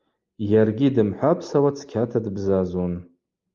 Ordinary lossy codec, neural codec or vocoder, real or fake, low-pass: Opus, 32 kbps; none; real; 7.2 kHz